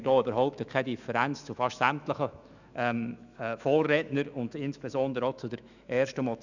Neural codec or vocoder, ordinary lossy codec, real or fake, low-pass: autoencoder, 48 kHz, 128 numbers a frame, DAC-VAE, trained on Japanese speech; none; fake; 7.2 kHz